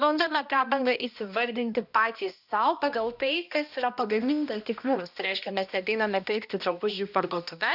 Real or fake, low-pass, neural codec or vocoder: fake; 5.4 kHz; codec, 16 kHz, 1 kbps, X-Codec, HuBERT features, trained on balanced general audio